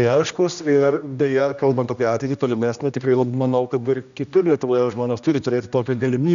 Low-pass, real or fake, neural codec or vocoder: 7.2 kHz; fake; codec, 16 kHz, 1 kbps, X-Codec, HuBERT features, trained on general audio